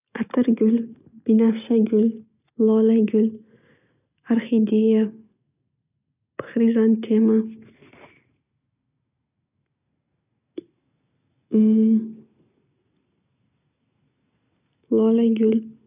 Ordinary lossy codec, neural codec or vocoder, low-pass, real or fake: none; none; 3.6 kHz; real